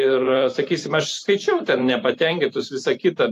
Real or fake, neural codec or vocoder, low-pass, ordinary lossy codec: fake; vocoder, 44.1 kHz, 128 mel bands every 512 samples, BigVGAN v2; 14.4 kHz; AAC, 64 kbps